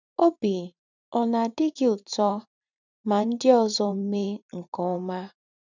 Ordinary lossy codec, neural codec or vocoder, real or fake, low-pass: none; vocoder, 44.1 kHz, 128 mel bands every 512 samples, BigVGAN v2; fake; 7.2 kHz